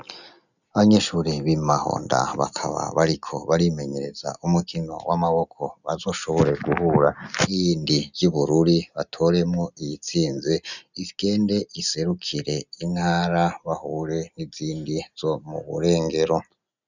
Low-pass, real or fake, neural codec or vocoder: 7.2 kHz; real; none